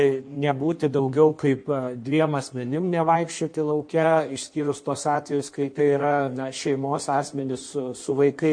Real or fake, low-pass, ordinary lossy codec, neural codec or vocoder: fake; 9.9 kHz; MP3, 48 kbps; codec, 16 kHz in and 24 kHz out, 1.1 kbps, FireRedTTS-2 codec